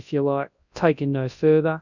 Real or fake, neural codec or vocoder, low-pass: fake; codec, 24 kHz, 0.9 kbps, WavTokenizer, large speech release; 7.2 kHz